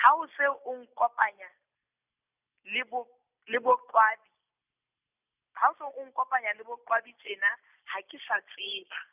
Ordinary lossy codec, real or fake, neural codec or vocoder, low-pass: none; real; none; 3.6 kHz